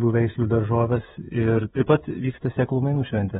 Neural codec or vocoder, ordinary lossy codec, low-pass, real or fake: codec, 16 kHz, 16 kbps, FreqCodec, smaller model; AAC, 16 kbps; 7.2 kHz; fake